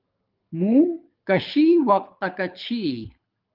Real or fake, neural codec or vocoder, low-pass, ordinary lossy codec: fake; codec, 24 kHz, 6 kbps, HILCodec; 5.4 kHz; Opus, 24 kbps